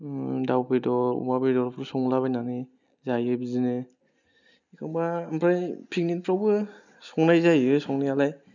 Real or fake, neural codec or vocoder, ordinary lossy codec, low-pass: real; none; none; 7.2 kHz